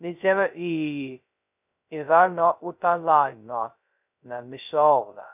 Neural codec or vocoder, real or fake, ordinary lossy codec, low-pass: codec, 16 kHz, 0.2 kbps, FocalCodec; fake; none; 3.6 kHz